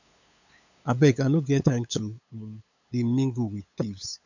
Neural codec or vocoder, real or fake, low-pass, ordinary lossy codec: codec, 16 kHz, 8 kbps, FunCodec, trained on LibriTTS, 25 frames a second; fake; 7.2 kHz; none